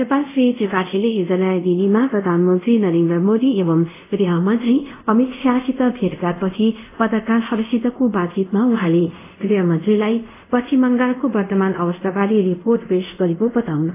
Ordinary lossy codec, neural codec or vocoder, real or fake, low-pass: AAC, 24 kbps; codec, 24 kHz, 0.5 kbps, DualCodec; fake; 3.6 kHz